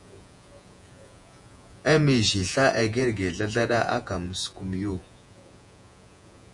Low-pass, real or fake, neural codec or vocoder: 10.8 kHz; fake; vocoder, 48 kHz, 128 mel bands, Vocos